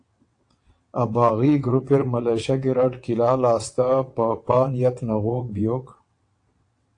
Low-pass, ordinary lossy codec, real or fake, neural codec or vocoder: 9.9 kHz; AAC, 48 kbps; fake; vocoder, 22.05 kHz, 80 mel bands, WaveNeXt